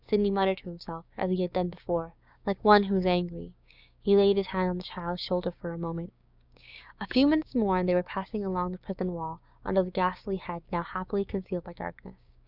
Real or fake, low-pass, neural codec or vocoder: fake; 5.4 kHz; codec, 16 kHz, 6 kbps, DAC